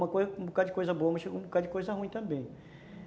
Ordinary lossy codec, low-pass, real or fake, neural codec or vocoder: none; none; real; none